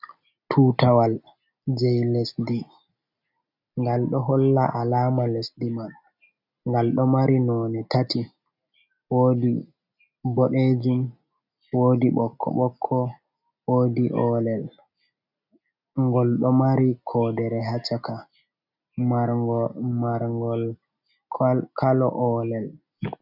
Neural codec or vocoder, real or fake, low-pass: none; real; 5.4 kHz